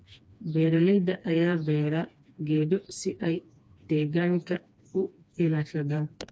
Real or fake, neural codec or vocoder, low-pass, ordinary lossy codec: fake; codec, 16 kHz, 2 kbps, FreqCodec, smaller model; none; none